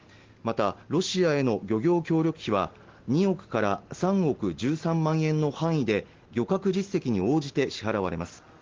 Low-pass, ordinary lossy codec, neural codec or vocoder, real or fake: 7.2 kHz; Opus, 16 kbps; none; real